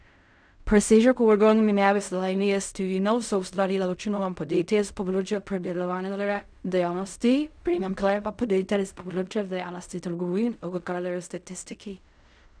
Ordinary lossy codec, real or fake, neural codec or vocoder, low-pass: none; fake; codec, 16 kHz in and 24 kHz out, 0.4 kbps, LongCat-Audio-Codec, fine tuned four codebook decoder; 9.9 kHz